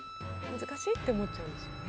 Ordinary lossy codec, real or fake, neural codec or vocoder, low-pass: none; real; none; none